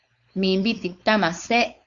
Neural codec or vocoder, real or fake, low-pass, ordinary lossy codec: codec, 16 kHz, 4.8 kbps, FACodec; fake; 7.2 kHz; Opus, 64 kbps